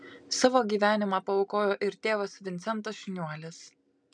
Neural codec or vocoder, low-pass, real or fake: none; 9.9 kHz; real